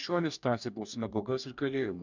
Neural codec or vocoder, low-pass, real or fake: codec, 44.1 kHz, 2.6 kbps, DAC; 7.2 kHz; fake